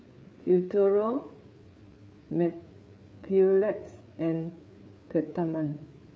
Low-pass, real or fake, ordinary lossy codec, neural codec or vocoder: none; fake; none; codec, 16 kHz, 4 kbps, FreqCodec, larger model